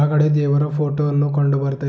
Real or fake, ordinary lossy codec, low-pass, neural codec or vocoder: real; none; none; none